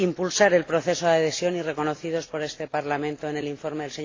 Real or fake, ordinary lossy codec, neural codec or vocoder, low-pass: real; AAC, 32 kbps; none; 7.2 kHz